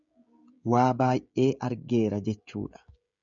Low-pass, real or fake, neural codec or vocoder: 7.2 kHz; fake; codec, 16 kHz, 16 kbps, FreqCodec, smaller model